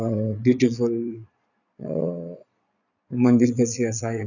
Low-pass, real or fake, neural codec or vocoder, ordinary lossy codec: 7.2 kHz; fake; vocoder, 22.05 kHz, 80 mel bands, Vocos; none